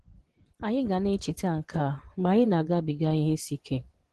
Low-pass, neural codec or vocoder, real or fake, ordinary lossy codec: 10.8 kHz; vocoder, 24 kHz, 100 mel bands, Vocos; fake; Opus, 16 kbps